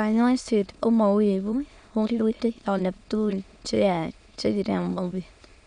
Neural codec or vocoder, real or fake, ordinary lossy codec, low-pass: autoencoder, 22.05 kHz, a latent of 192 numbers a frame, VITS, trained on many speakers; fake; none; 9.9 kHz